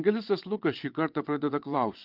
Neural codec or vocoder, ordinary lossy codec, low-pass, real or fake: vocoder, 22.05 kHz, 80 mel bands, WaveNeXt; Opus, 32 kbps; 5.4 kHz; fake